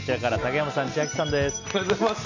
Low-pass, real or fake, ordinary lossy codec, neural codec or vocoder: 7.2 kHz; real; AAC, 48 kbps; none